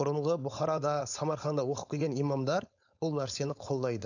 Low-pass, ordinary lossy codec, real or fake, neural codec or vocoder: 7.2 kHz; none; fake; codec, 16 kHz, 4.8 kbps, FACodec